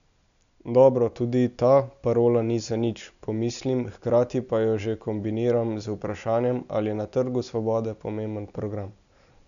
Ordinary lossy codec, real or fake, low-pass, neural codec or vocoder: none; real; 7.2 kHz; none